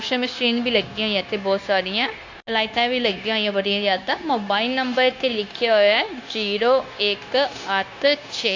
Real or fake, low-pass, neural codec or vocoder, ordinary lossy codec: fake; 7.2 kHz; codec, 16 kHz, 0.9 kbps, LongCat-Audio-Codec; AAC, 48 kbps